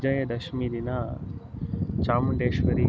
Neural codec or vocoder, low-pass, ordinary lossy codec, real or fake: none; none; none; real